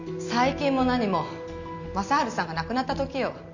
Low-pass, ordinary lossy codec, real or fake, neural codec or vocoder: 7.2 kHz; none; real; none